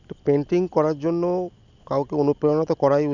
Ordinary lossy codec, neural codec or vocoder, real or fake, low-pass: none; none; real; 7.2 kHz